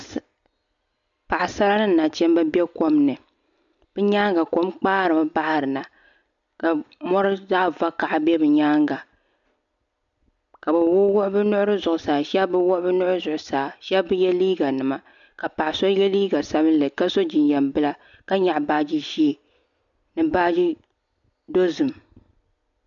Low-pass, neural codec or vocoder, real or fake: 7.2 kHz; none; real